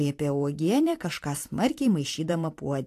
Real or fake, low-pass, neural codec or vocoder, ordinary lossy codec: fake; 14.4 kHz; vocoder, 44.1 kHz, 128 mel bands every 512 samples, BigVGAN v2; AAC, 64 kbps